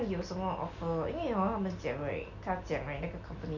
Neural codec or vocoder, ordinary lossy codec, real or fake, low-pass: none; none; real; 7.2 kHz